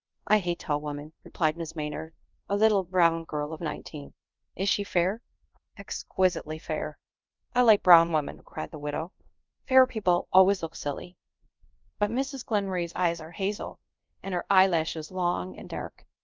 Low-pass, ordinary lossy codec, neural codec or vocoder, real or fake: 7.2 kHz; Opus, 24 kbps; codec, 24 kHz, 0.5 kbps, DualCodec; fake